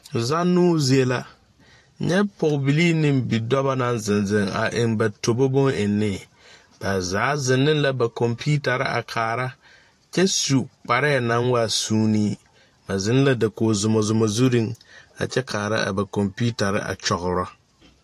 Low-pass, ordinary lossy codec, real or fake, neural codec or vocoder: 14.4 kHz; AAC, 64 kbps; fake; vocoder, 44.1 kHz, 128 mel bands every 512 samples, BigVGAN v2